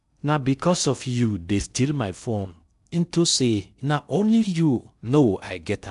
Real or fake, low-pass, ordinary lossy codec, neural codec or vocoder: fake; 10.8 kHz; none; codec, 16 kHz in and 24 kHz out, 0.6 kbps, FocalCodec, streaming, 2048 codes